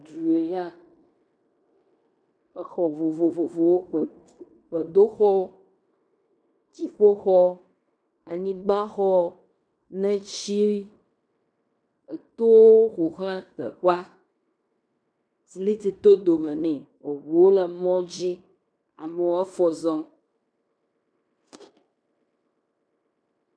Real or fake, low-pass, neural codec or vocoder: fake; 9.9 kHz; codec, 16 kHz in and 24 kHz out, 0.9 kbps, LongCat-Audio-Codec, fine tuned four codebook decoder